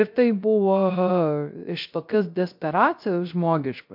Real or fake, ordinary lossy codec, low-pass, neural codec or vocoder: fake; MP3, 48 kbps; 5.4 kHz; codec, 16 kHz, 0.3 kbps, FocalCodec